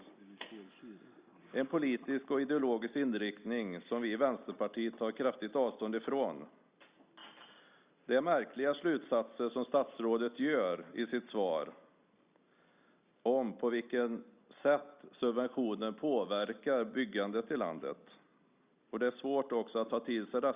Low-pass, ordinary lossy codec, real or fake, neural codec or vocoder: 3.6 kHz; Opus, 64 kbps; real; none